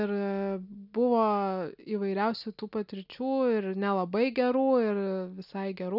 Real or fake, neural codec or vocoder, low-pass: real; none; 5.4 kHz